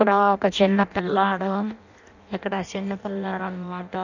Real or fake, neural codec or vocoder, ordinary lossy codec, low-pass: fake; codec, 16 kHz in and 24 kHz out, 0.6 kbps, FireRedTTS-2 codec; none; 7.2 kHz